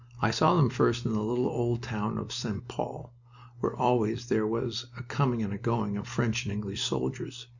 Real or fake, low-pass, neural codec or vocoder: real; 7.2 kHz; none